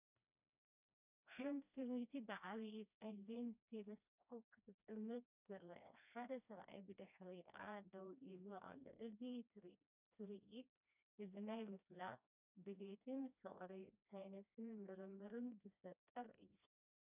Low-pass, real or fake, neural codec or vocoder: 3.6 kHz; fake; codec, 16 kHz, 1 kbps, FreqCodec, smaller model